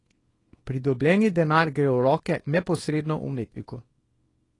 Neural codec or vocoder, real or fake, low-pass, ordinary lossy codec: codec, 24 kHz, 0.9 kbps, WavTokenizer, small release; fake; 10.8 kHz; AAC, 32 kbps